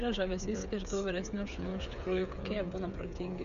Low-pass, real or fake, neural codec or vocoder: 7.2 kHz; fake; codec, 16 kHz, 8 kbps, FreqCodec, smaller model